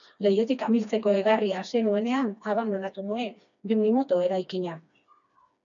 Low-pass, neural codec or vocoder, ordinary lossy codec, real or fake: 7.2 kHz; codec, 16 kHz, 2 kbps, FreqCodec, smaller model; MP3, 96 kbps; fake